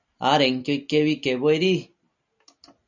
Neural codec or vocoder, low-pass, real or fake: none; 7.2 kHz; real